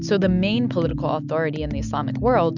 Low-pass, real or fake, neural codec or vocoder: 7.2 kHz; real; none